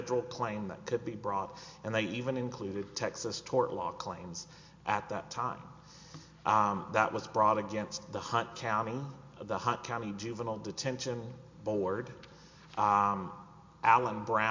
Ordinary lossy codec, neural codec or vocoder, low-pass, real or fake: MP3, 48 kbps; none; 7.2 kHz; real